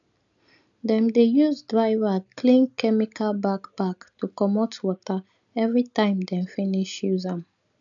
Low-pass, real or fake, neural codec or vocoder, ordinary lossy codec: 7.2 kHz; real; none; none